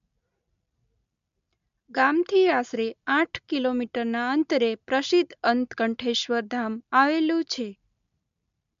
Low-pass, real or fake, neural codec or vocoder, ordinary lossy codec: 7.2 kHz; real; none; MP3, 64 kbps